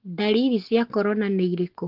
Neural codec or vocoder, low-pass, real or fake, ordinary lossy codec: none; 5.4 kHz; real; Opus, 16 kbps